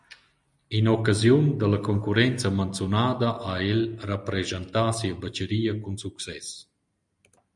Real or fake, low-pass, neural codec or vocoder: real; 10.8 kHz; none